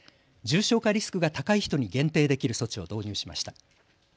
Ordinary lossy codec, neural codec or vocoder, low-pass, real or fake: none; none; none; real